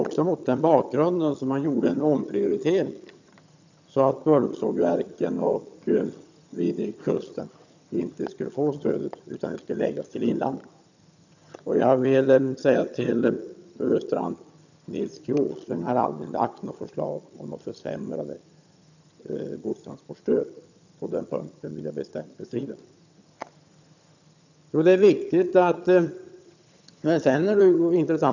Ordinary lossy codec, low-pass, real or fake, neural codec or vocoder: none; 7.2 kHz; fake; vocoder, 22.05 kHz, 80 mel bands, HiFi-GAN